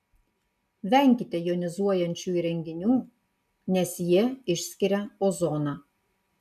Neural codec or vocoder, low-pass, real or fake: none; 14.4 kHz; real